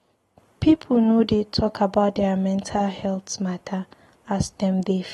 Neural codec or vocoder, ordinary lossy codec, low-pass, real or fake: none; AAC, 32 kbps; 19.8 kHz; real